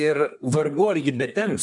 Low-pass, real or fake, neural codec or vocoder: 10.8 kHz; fake; codec, 24 kHz, 1 kbps, SNAC